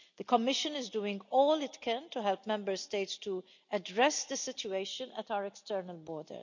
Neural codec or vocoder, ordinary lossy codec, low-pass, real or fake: none; none; 7.2 kHz; real